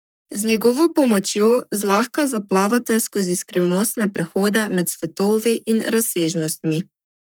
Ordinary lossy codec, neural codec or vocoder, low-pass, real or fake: none; codec, 44.1 kHz, 3.4 kbps, Pupu-Codec; none; fake